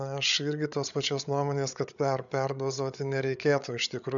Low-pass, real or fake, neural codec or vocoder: 7.2 kHz; fake; codec, 16 kHz, 8 kbps, FreqCodec, larger model